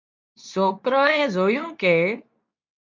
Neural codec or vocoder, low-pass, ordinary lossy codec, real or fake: codec, 24 kHz, 0.9 kbps, WavTokenizer, medium speech release version 2; 7.2 kHz; MP3, 64 kbps; fake